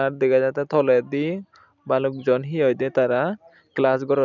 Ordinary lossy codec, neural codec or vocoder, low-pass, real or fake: none; none; 7.2 kHz; real